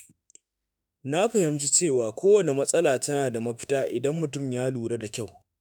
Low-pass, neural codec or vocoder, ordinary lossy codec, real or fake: none; autoencoder, 48 kHz, 32 numbers a frame, DAC-VAE, trained on Japanese speech; none; fake